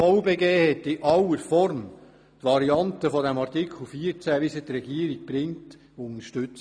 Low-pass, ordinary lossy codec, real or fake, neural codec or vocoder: 9.9 kHz; none; real; none